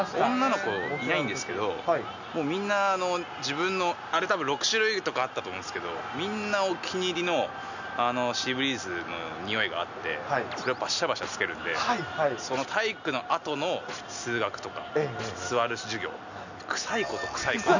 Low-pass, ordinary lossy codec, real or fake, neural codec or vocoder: 7.2 kHz; none; real; none